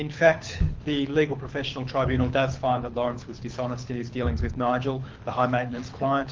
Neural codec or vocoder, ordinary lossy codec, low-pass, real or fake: codec, 24 kHz, 6 kbps, HILCodec; Opus, 32 kbps; 7.2 kHz; fake